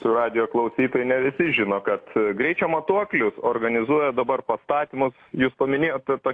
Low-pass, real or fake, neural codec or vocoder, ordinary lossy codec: 9.9 kHz; real; none; AAC, 48 kbps